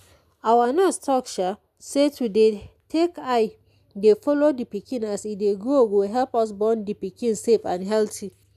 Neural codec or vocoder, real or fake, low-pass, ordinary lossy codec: none; real; 14.4 kHz; none